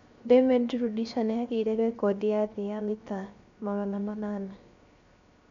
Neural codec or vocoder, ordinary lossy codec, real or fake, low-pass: codec, 16 kHz, 0.7 kbps, FocalCodec; MP3, 64 kbps; fake; 7.2 kHz